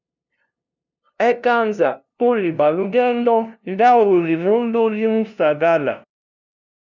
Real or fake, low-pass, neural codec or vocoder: fake; 7.2 kHz; codec, 16 kHz, 0.5 kbps, FunCodec, trained on LibriTTS, 25 frames a second